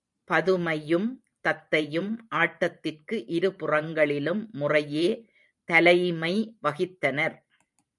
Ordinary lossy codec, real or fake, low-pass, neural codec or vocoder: MP3, 96 kbps; fake; 10.8 kHz; vocoder, 44.1 kHz, 128 mel bands every 512 samples, BigVGAN v2